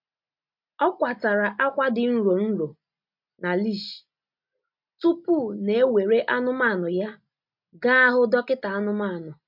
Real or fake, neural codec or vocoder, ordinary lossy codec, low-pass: real; none; MP3, 48 kbps; 5.4 kHz